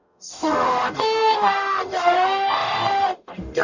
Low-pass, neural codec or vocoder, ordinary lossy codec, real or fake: 7.2 kHz; codec, 44.1 kHz, 0.9 kbps, DAC; none; fake